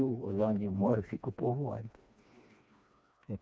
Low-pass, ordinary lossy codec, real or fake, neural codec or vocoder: none; none; fake; codec, 16 kHz, 2 kbps, FreqCodec, smaller model